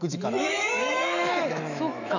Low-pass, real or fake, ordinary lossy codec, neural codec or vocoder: 7.2 kHz; fake; none; autoencoder, 48 kHz, 128 numbers a frame, DAC-VAE, trained on Japanese speech